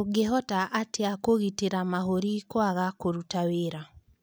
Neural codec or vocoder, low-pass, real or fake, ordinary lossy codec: none; none; real; none